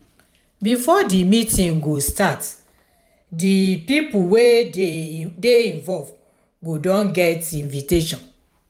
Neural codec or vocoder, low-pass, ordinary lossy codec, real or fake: vocoder, 44.1 kHz, 128 mel bands every 512 samples, BigVGAN v2; 19.8 kHz; none; fake